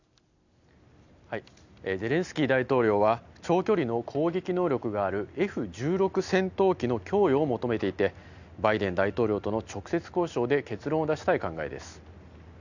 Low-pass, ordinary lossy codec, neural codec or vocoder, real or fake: 7.2 kHz; none; none; real